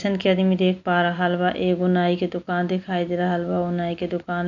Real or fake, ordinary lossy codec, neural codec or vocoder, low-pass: real; none; none; 7.2 kHz